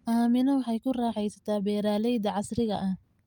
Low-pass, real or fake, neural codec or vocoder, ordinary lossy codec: 19.8 kHz; real; none; Opus, 32 kbps